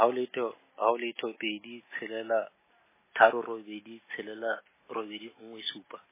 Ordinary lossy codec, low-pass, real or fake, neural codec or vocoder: MP3, 16 kbps; 3.6 kHz; real; none